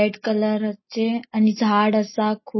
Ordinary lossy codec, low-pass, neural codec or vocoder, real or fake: MP3, 24 kbps; 7.2 kHz; none; real